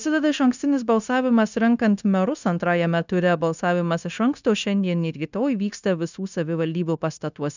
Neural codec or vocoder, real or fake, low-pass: codec, 16 kHz, 0.9 kbps, LongCat-Audio-Codec; fake; 7.2 kHz